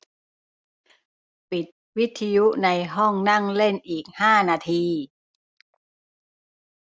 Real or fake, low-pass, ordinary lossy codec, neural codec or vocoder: real; none; none; none